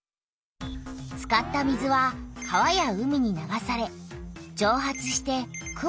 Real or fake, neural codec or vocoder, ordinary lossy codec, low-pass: real; none; none; none